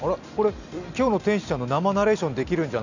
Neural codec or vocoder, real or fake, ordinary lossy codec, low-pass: none; real; none; 7.2 kHz